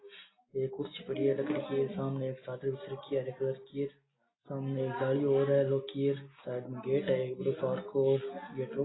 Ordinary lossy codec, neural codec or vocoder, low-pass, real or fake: AAC, 16 kbps; none; 7.2 kHz; real